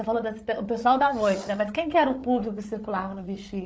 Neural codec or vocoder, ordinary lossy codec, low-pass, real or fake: codec, 16 kHz, 8 kbps, FreqCodec, larger model; none; none; fake